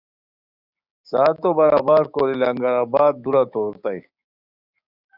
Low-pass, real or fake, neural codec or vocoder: 5.4 kHz; fake; codec, 16 kHz, 6 kbps, DAC